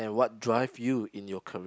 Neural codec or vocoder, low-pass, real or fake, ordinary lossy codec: none; none; real; none